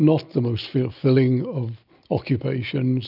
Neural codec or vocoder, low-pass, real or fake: none; 5.4 kHz; real